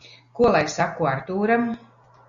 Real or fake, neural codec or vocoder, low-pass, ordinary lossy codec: real; none; 7.2 kHz; Opus, 64 kbps